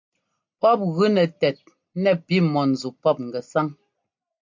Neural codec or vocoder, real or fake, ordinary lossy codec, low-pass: none; real; MP3, 48 kbps; 7.2 kHz